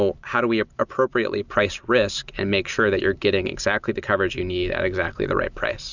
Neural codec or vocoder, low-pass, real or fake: none; 7.2 kHz; real